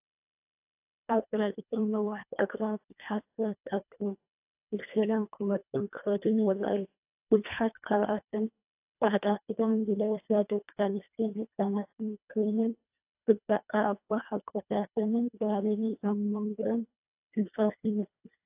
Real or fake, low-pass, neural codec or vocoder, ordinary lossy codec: fake; 3.6 kHz; codec, 24 kHz, 1.5 kbps, HILCodec; AAC, 32 kbps